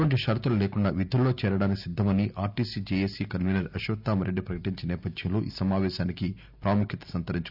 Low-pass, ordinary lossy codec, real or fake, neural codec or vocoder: 5.4 kHz; none; real; none